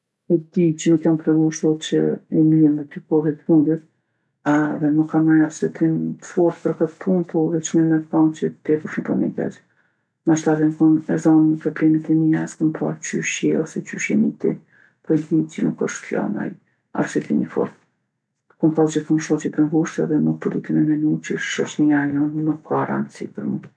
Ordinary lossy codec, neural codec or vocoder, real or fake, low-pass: none; none; real; none